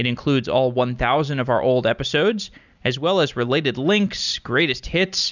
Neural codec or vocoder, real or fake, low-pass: none; real; 7.2 kHz